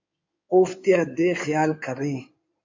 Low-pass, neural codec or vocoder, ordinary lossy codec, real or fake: 7.2 kHz; codec, 16 kHz in and 24 kHz out, 2.2 kbps, FireRedTTS-2 codec; MP3, 48 kbps; fake